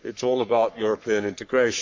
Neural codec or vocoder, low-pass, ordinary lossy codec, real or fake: codec, 44.1 kHz, 3.4 kbps, Pupu-Codec; 7.2 kHz; MP3, 48 kbps; fake